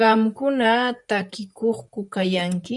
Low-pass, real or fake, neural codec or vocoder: 10.8 kHz; fake; vocoder, 44.1 kHz, 128 mel bands, Pupu-Vocoder